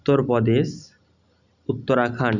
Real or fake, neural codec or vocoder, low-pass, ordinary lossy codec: real; none; 7.2 kHz; none